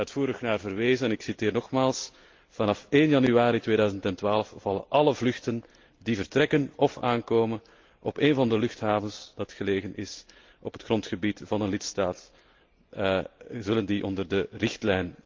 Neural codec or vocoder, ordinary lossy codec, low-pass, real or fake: none; Opus, 32 kbps; 7.2 kHz; real